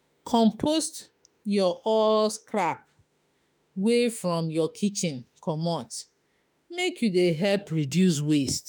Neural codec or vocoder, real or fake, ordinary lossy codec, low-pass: autoencoder, 48 kHz, 32 numbers a frame, DAC-VAE, trained on Japanese speech; fake; none; none